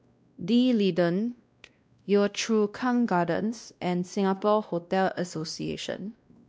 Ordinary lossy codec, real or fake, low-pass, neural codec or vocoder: none; fake; none; codec, 16 kHz, 1 kbps, X-Codec, WavLM features, trained on Multilingual LibriSpeech